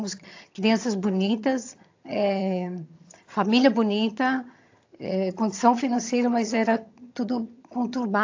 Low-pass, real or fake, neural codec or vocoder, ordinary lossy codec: 7.2 kHz; fake; vocoder, 22.05 kHz, 80 mel bands, HiFi-GAN; AAC, 48 kbps